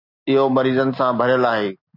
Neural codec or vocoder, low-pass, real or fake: none; 5.4 kHz; real